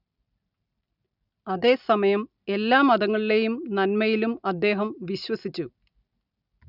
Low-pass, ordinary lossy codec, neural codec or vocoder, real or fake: 5.4 kHz; none; none; real